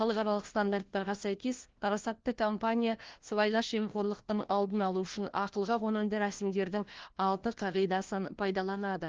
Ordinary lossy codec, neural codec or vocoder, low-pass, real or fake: Opus, 16 kbps; codec, 16 kHz, 1 kbps, FunCodec, trained on LibriTTS, 50 frames a second; 7.2 kHz; fake